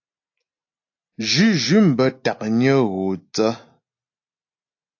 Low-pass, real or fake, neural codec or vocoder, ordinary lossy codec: 7.2 kHz; real; none; AAC, 48 kbps